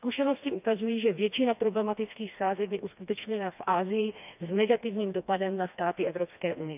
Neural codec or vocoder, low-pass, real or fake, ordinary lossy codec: codec, 16 kHz, 2 kbps, FreqCodec, smaller model; 3.6 kHz; fake; none